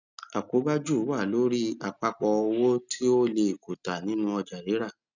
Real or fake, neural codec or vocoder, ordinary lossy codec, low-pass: real; none; none; 7.2 kHz